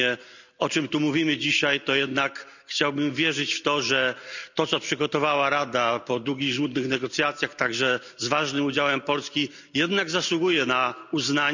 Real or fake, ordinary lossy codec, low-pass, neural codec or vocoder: real; none; 7.2 kHz; none